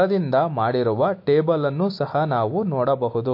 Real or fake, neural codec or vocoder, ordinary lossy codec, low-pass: real; none; MP3, 32 kbps; 5.4 kHz